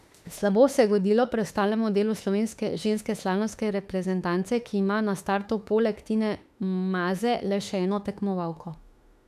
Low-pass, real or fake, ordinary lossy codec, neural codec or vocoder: 14.4 kHz; fake; none; autoencoder, 48 kHz, 32 numbers a frame, DAC-VAE, trained on Japanese speech